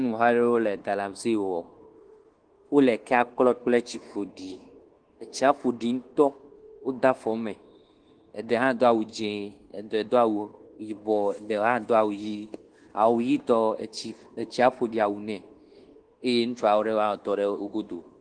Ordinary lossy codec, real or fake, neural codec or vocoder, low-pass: Opus, 16 kbps; fake; codec, 24 kHz, 1.2 kbps, DualCodec; 9.9 kHz